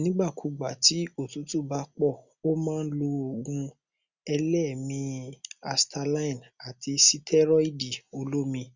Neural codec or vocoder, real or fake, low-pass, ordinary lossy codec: none; real; 7.2 kHz; Opus, 64 kbps